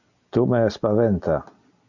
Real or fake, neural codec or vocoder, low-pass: real; none; 7.2 kHz